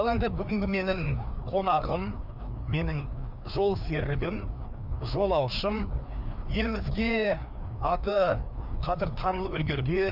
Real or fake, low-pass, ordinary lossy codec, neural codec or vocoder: fake; 5.4 kHz; none; codec, 16 kHz, 2 kbps, FreqCodec, larger model